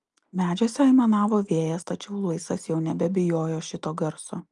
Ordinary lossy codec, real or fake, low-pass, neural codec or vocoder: Opus, 32 kbps; real; 10.8 kHz; none